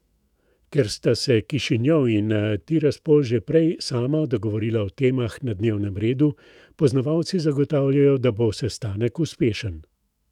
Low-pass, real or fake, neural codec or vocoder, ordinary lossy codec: 19.8 kHz; fake; autoencoder, 48 kHz, 128 numbers a frame, DAC-VAE, trained on Japanese speech; none